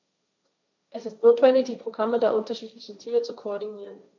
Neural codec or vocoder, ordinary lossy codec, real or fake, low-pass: codec, 16 kHz, 1.1 kbps, Voila-Tokenizer; none; fake; 7.2 kHz